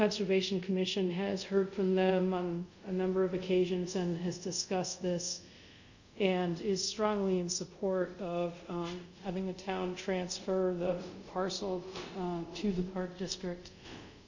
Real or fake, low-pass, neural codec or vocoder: fake; 7.2 kHz; codec, 24 kHz, 0.5 kbps, DualCodec